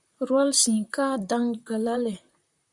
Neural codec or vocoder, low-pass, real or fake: vocoder, 44.1 kHz, 128 mel bands, Pupu-Vocoder; 10.8 kHz; fake